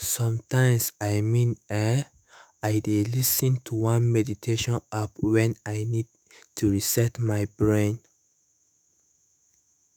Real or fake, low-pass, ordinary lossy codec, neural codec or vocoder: fake; none; none; autoencoder, 48 kHz, 128 numbers a frame, DAC-VAE, trained on Japanese speech